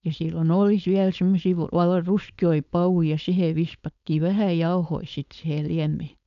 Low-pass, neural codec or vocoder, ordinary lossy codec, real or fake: 7.2 kHz; codec, 16 kHz, 4.8 kbps, FACodec; MP3, 96 kbps; fake